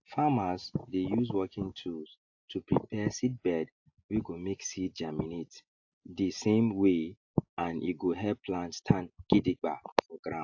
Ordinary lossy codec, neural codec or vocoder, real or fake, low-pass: AAC, 48 kbps; none; real; 7.2 kHz